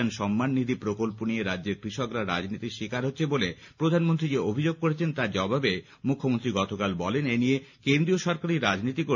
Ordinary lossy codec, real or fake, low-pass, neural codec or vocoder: none; real; 7.2 kHz; none